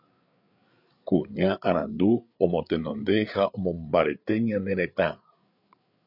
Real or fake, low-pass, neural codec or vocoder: fake; 5.4 kHz; codec, 16 kHz, 8 kbps, FreqCodec, larger model